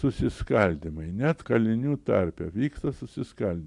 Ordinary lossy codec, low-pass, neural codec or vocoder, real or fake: MP3, 96 kbps; 10.8 kHz; none; real